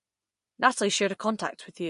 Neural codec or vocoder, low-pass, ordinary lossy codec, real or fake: vocoder, 24 kHz, 100 mel bands, Vocos; 10.8 kHz; MP3, 48 kbps; fake